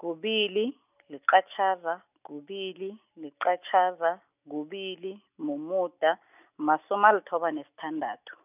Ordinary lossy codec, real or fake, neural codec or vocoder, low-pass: none; real; none; 3.6 kHz